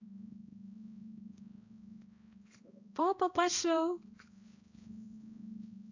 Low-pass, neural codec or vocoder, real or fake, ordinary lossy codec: 7.2 kHz; codec, 16 kHz, 1 kbps, X-Codec, HuBERT features, trained on balanced general audio; fake; AAC, 48 kbps